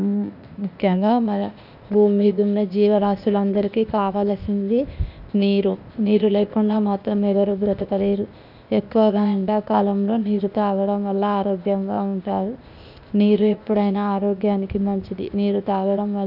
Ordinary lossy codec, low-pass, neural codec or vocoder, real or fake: none; 5.4 kHz; codec, 16 kHz, 0.8 kbps, ZipCodec; fake